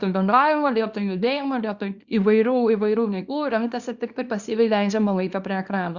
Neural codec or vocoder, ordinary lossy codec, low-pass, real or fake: codec, 24 kHz, 0.9 kbps, WavTokenizer, small release; Opus, 64 kbps; 7.2 kHz; fake